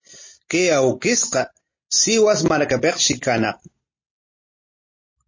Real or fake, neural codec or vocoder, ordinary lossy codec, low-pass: real; none; MP3, 32 kbps; 7.2 kHz